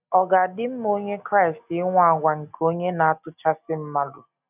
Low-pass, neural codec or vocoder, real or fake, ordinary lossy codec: 3.6 kHz; none; real; none